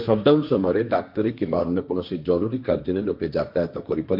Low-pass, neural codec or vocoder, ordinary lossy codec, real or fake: 5.4 kHz; codec, 16 kHz, 1.1 kbps, Voila-Tokenizer; none; fake